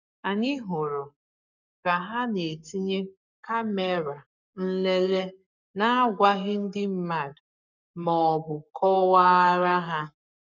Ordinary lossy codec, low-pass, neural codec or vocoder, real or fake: none; 7.2 kHz; codec, 44.1 kHz, 7.8 kbps, Pupu-Codec; fake